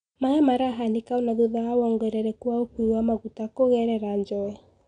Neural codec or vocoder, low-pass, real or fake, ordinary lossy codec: none; 9.9 kHz; real; none